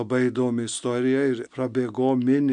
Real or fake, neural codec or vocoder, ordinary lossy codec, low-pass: real; none; MP3, 64 kbps; 9.9 kHz